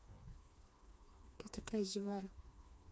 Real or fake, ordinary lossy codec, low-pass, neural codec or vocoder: fake; none; none; codec, 16 kHz, 2 kbps, FreqCodec, smaller model